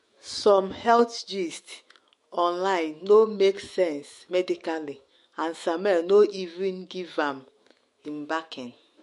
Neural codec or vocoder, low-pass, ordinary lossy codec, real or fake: autoencoder, 48 kHz, 128 numbers a frame, DAC-VAE, trained on Japanese speech; 14.4 kHz; MP3, 48 kbps; fake